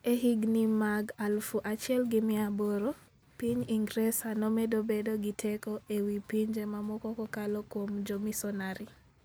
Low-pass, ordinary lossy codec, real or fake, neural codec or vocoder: none; none; real; none